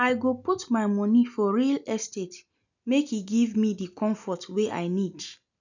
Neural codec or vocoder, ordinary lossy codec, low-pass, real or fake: none; none; 7.2 kHz; real